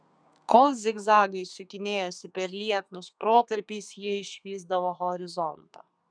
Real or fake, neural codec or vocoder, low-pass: fake; codec, 32 kHz, 1.9 kbps, SNAC; 9.9 kHz